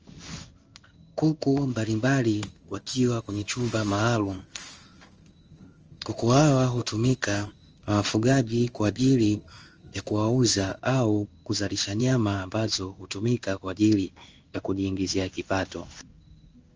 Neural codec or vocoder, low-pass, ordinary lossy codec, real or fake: codec, 16 kHz in and 24 kHz out, 1 kbps, XY-Tokenizer; 7.2 kHz; Opus, 24 kbps; fake